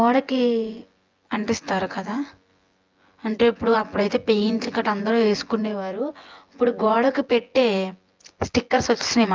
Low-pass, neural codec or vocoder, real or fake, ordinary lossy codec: 7.2 kHz; vocoder, 24 kHz, 100 mel bands, Vocos; fake; Opus, 24 kbps